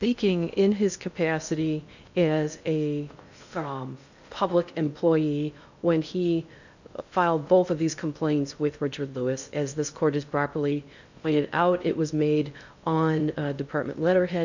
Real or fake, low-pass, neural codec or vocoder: fake; 7.2 kHz; codec, 16 kHz in and 24 kHz out, 0.6 kbps, FocalCodec, streaming, 2048 codes